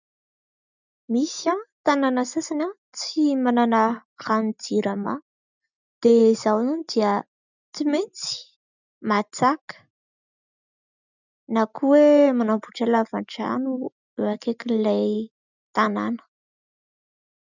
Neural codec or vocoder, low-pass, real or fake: none; 7.2 kHz; real